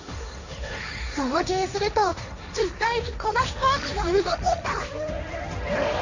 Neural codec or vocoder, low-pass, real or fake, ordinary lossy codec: codec, 16 kHz, 1.1 kbps, Voila-Tokenizer; 7.2 kHz; fake; none